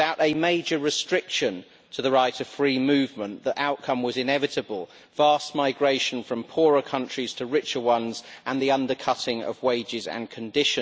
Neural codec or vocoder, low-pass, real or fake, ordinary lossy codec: none; none; real; none